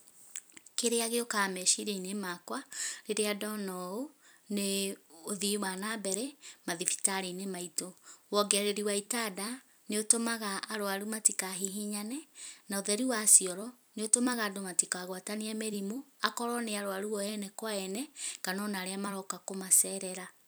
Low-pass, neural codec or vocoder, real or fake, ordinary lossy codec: none; vocoder, 44.1 kHz, 128 mel bands every 256 samples, BigVGAN v2; fake; none